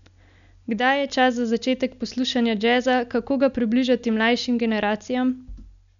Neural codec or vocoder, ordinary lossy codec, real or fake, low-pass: none; none; real; 7.2 kHz